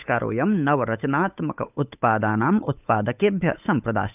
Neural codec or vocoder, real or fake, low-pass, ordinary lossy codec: codec, 16 kHz, 8 kbps, FunCodec, trained on Chinese and English, 25 frames a second; fake; 3.6 kHz; none